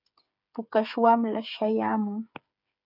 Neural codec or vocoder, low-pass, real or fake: codec, 16 kHz, 8 kbps, FreqCodec, smaller model; 5.4 kHz; fake